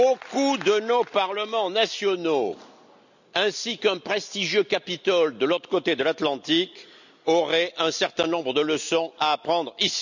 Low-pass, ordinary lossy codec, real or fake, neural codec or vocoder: 7.2 kHz; none; real; none